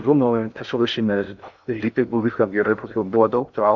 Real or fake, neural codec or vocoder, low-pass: fake; codec, 16 kHz in and 24 kHz out, 0.6 kbps, FocalCodec, streaming, 2048 codes; 7.2 kHz